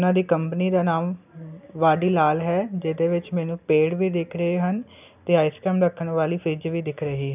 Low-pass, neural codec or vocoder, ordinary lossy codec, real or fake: 3.6 kHz; none; none; real